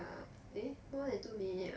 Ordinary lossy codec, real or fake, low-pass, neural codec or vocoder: none; real; none; none